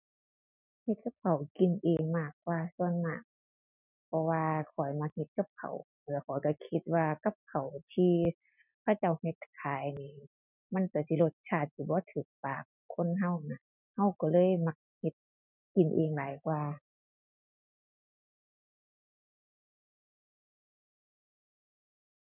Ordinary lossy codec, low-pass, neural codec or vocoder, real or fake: none; 3.6 kHz; none; real